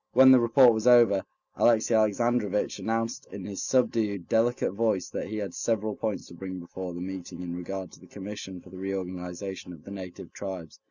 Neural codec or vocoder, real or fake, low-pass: none; real; 7.2 kHz